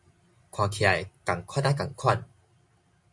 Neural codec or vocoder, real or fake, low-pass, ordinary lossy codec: none; real; 10.8 kHz; MP3, 64 kbps